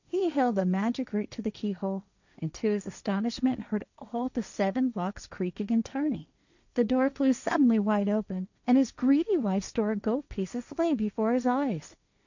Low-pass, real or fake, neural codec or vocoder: 7.2 kHz; fake; codec, 16 kHz, 1.1 kbps, Voila-Tokenizer